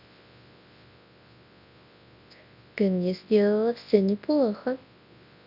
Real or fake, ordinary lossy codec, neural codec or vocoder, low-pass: fake; none; codec, 24 kHz, 0.9 kbps, WavTokenizer, large speech release; 5.4 kHz